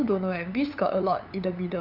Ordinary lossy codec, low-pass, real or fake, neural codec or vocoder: none; 5.4 kHz; fake; codec, 16 kHz, 8 kbps, FunCodec, trained on LibriTTS, 25 frames a second